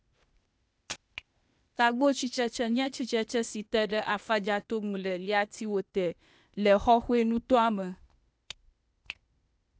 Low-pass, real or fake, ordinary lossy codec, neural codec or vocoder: none; fake; none; codec, 16 kHz, 0.8 kbps, ZipCodec